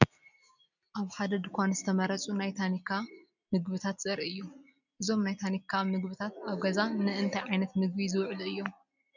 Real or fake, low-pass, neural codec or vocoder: real; 7.2 kHz; none